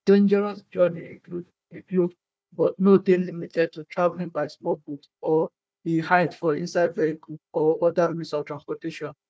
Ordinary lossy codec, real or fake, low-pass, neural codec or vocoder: none; fake; none; codec, 16 kHz, 1 kbps, FunCodec, trained on Chinese and English, 50 frames a second